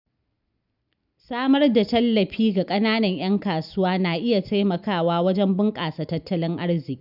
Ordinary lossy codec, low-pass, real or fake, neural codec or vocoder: none; 5.4 kHz; real; none